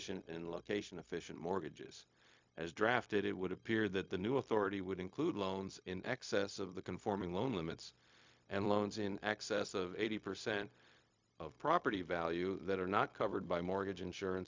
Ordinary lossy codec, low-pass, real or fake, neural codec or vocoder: MP3, 64 kbps; 7.2 kHz; fake; codec, 16 kHz, 0.4 kbps, LongCat-Audio-Codec